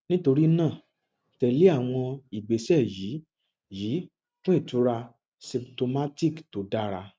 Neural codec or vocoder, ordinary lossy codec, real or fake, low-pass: none; none; real; none